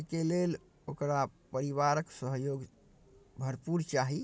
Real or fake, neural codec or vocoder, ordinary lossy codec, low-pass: real; none; none; none